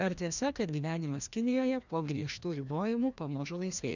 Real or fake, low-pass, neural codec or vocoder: fake; 7.2 kHz; codec, 16 kHz, 1 kbps, FreqCodec, larger model